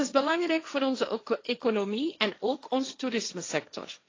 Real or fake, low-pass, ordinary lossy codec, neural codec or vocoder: fake; 7.2 kHz; AAC, 32 kbps; codec, 16 kHz, 1.1 kbps, Voila-Tokenizer